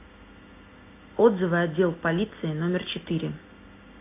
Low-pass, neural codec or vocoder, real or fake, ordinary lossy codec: 3.6 kHz; none; real; AAC, 24 kbps